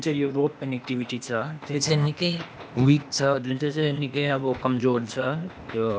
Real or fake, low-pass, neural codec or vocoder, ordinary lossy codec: fake; none; codec, 16 kHz, 0.8 kbps, ZipCodec; none